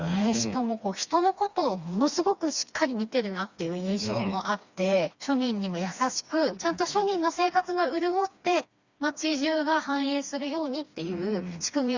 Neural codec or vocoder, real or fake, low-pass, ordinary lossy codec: codec, 16 kHz, 2 kbps, FreqCodec, smaller model; fake; 7.2 kHz; Opus, 64 kbps